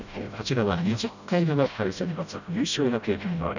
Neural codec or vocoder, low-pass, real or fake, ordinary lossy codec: codec, 16 kHz, 0.5 kbps, FreqCodec, smaller model; 7.2 kHz; fake; none